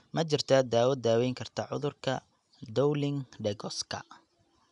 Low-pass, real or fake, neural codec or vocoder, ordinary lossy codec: 10.8 kHz; real; none; none